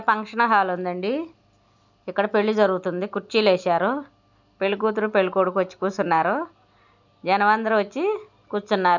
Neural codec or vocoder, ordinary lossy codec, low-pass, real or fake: none; none; 7.2 kHz; real